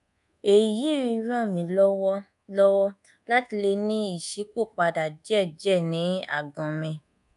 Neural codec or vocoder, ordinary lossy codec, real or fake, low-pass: codec, 24 kHz, 1.2 kbps, DualCodec; none; fake; 10.8 kHz